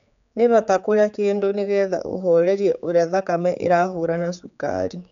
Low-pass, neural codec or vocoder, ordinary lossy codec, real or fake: 7.2 kHz; codec, 16 kHz, 4 kbps, X-Codec, HuBERT features, trained on general audio; none; fake